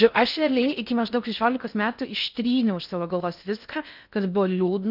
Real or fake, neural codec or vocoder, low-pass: fake; codec, 16 kHz in and 24 kHz out, 0.6 kbps, FocalCodec, streaming, 4096 codes; 5.4 kHz